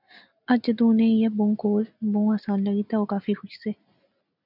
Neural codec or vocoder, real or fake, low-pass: none; real; 5.4 kHz